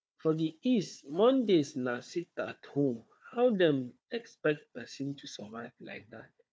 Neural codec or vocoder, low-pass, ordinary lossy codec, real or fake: codec, 16 kHz, 4 kbps, FunCodec, trained on Chinese and English, 50 frames a second; none; none; fake